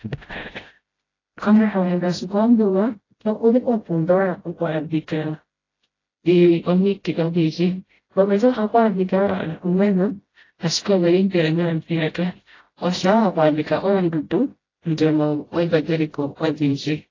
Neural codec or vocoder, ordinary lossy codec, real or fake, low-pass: codec, 16 kHz, 0.5 kbps, FreqCodec, smaller model; AAC, 32 kbps; fake; 7.2 kHz